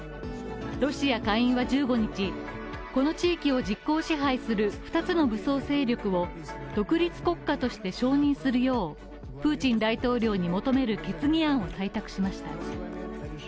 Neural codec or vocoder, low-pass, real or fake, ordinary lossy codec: none; none; real; none